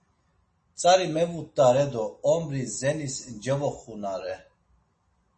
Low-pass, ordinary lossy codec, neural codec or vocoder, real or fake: 10.8 kHz; MP3, 32 kbps; none; real